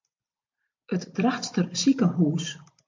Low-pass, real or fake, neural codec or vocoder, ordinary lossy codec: 7.2 kHz; real; none; MP3, 48 kbps